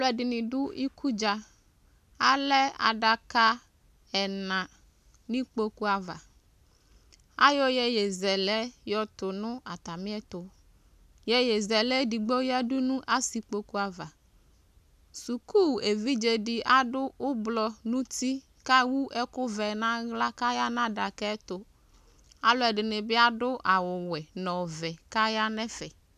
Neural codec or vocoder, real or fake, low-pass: none; real; 14.4 kHz